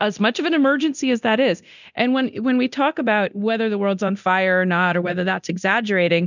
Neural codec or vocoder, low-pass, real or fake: codec, 24 kHz, 0.9 kbps, DualCodec; 7.2 kHz; fake